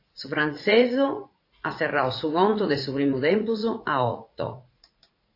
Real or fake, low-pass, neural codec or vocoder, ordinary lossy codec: fake; 5.4 kHz; vocoder, 44.1 kHz, 80 mel bands, Vocos; AAC, 32 kbps